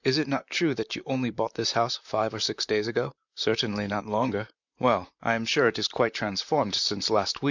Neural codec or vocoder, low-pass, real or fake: vocoder, 44.1 kHz, 128 mel bands, Pupu-Vocoder; 7.2 kHz; fake